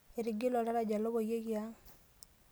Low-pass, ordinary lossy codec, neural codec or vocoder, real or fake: none; none; none; real